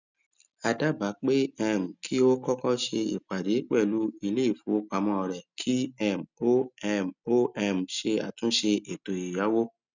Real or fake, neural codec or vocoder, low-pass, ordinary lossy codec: real; none; 7.2 kHz; none